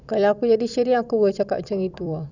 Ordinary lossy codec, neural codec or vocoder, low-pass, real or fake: none; vocoder, 44.1 kHz, 128 mel bands every 256 samples, BigVGAN v2; 7.2 kHz; fake